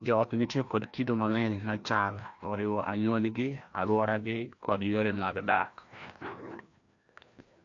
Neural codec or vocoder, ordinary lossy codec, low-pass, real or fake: codec, 16 kHz, 1 kbps, FreqCodec, larger model; AAC, 48 kbps; 7.2 kHz; fake